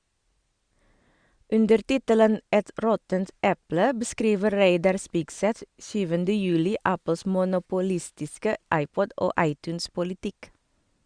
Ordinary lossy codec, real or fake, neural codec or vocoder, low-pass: Opus, 64 kbps; real; none; 9.9 kHz